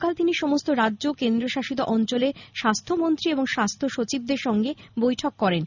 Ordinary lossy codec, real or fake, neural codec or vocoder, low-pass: none; real; none; 7.2 kHz